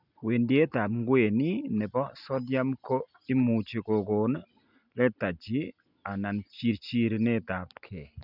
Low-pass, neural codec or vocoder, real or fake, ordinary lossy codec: 5.4 kHz; none; real; none